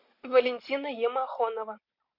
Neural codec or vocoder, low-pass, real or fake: none; 5.4 kHz; real